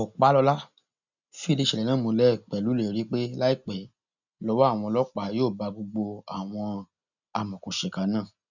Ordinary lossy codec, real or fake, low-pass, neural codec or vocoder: none; real; 7.2 kHz; none